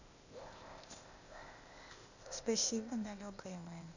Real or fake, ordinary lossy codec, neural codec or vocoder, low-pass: fake; none; codec, 16 kHz, 0.8 kbps, ZipCodec; 7.2 kHz